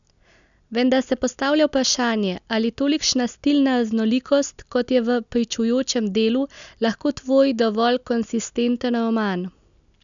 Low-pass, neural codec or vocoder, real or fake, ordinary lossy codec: 7.2 kHz; none; real; none